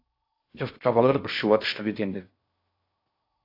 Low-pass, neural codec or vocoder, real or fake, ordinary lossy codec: 5.4 kHz; codec, 16 kHz in and 24 kHz out, 0.6 kbps, FocalCodec, streaming, 4096 codes; fake; AAC, 32 kbps